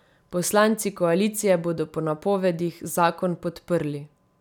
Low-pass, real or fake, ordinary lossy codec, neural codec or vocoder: 19.8 kHz; real; none; none